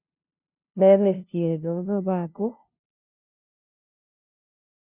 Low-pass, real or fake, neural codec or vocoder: 3.6 kHz; fake; codec, 16 kHz, 0.5 kbps, FunCodec, trained on LibriTTS, 25 frames a second